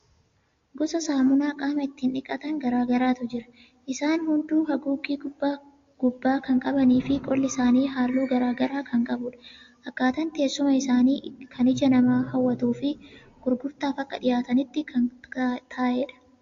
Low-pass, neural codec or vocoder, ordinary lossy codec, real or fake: 7.2 kHz; none; AAC, 48 kbps; real